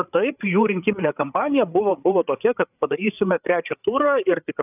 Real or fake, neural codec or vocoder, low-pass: fake; codec, 16 kHz, 8 kbps, FreqCodec, larger model; 3.6 kHz